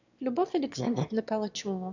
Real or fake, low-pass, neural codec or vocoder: fake; 7.2 kHz; autoencoder, 22.05 kHz, a latent of 192 numbers a frame, VITS, trained on one speaker